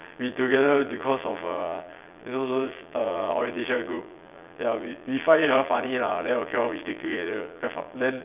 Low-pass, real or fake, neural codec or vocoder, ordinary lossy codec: 3.6 kHz; fake; vocoder, 22.05 kHz, 80 mel bands, Vocos; none